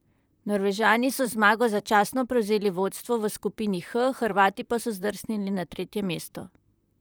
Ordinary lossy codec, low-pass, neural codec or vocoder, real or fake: none; none; vocoder, 44.1 kHz, 128 mel bands, Pupu-Vocoder; fake